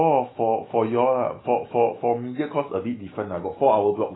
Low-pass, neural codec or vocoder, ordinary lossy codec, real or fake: 7.2 kHz; autoencoder, 48 kHz, 128 numbers a frame, DAC-VAE, trained on Japanese speech; AAC, 16 kbps; fake